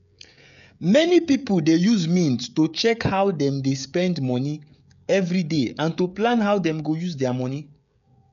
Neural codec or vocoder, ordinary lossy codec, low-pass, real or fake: codec, 16 kHz, 16 kbps, FreqCodec, smaller model; none; 7.2 kHz; fake